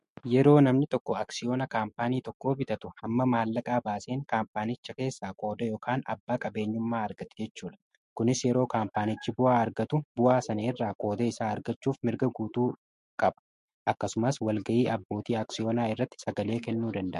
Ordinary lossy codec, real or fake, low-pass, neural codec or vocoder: MP3, 48 kbps; fake; 14.4 kHz; autoencoder, 48 kHz, 128 numbers a frame, DAC-VAE, trained on Japanese speech